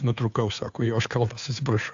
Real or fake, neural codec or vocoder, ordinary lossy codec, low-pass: fake; codec, 16 kHz, 2 kbps, FunCodec, trained on Chinese and English, 25 frames a second; AAC, 64 kbps; 7.2 kHz